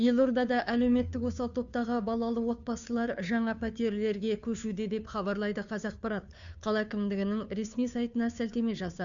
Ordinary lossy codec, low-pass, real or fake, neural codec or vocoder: none; 7.2 kHz; fake; codec, 16 kHz, 2 kbps, FunCodec, trained on Chinese and English, 25 frames a second